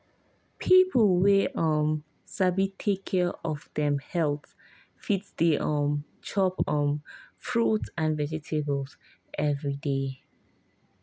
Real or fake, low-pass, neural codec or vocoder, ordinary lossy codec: real; none; none; none